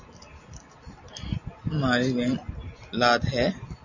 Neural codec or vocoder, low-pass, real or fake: none; 7.2 kHz; real